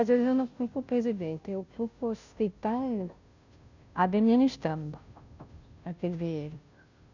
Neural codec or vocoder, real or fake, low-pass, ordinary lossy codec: codec, 16 kHz, 0.5 kbps, FunCodec, trained on Chinese and English, 25 frames a second; fake; 7.2 kHz; none